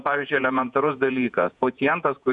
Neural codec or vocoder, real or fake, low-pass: vocoder, 48 kHz, 128 mel bands, Vocos; fake; 10.8 kHz